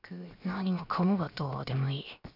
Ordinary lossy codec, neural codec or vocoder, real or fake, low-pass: none; codec, 16 kHz, 0.7 kbps, FocalCodec; fake; 5.4 kHz